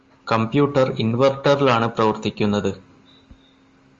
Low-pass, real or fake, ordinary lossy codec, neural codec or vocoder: 7.2 kHz; real; Opus, 32 kbps; none